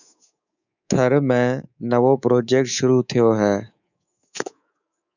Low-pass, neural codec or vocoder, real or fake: 7.2 kHz; codec, 24 kHz, 3.1 kbps, DualCodec; fake